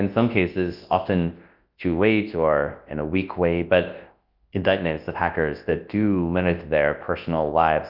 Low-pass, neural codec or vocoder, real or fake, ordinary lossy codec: 5.4 kHz; codec, 24 kHz, 0.9 kbps, WavTokenizer, large speech release; fake; Opus, 32 kbps